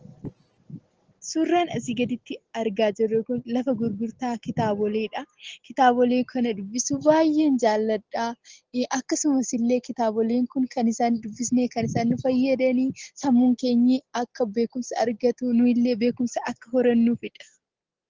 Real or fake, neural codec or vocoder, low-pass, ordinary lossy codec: real; none; 7.2 kHz; Opus, 16 kbps